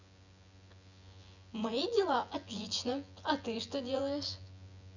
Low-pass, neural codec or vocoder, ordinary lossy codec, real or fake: 7.2 kHz; vocoder, 24 kHz, 100 mel bands, Vocos; none; fake